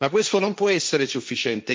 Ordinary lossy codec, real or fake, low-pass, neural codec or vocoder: none; fake; none; codec, 16 kHz, 1.1 kbps, Voila-Tokenizer